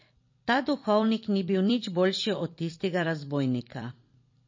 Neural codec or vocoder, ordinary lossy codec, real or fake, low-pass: vocoder, 44.1 kHz, 128 mel bands every 256 samples, BigVGAN v2; MP3, 32 kbps; fake; 7.2 kHz